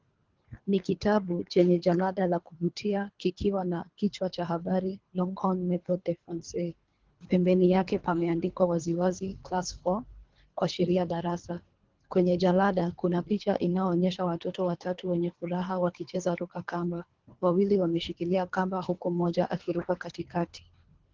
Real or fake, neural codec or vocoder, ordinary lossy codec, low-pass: fake; codec, 24 kHz, 3 kbps, HILCodec; Opus, 16 kbps; 7.2 kHz